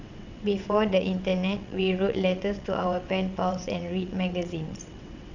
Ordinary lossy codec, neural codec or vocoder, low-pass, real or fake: none; vocoder, 22.05 kHz, 80 mel bands, WaveNeXt; 7.2 kHz; fake